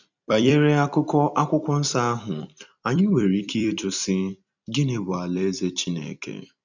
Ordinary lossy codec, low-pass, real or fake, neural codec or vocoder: none; 7.2 kHz; fake; vocoder, 44.1 kHz, 128 mel bands every 256 samples, BigVGAN v2